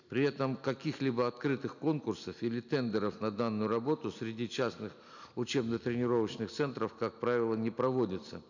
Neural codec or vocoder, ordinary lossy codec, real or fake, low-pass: none; none; real; 7.2 kHz